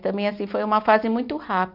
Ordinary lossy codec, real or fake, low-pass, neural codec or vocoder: none; real; 5.4 kHz; none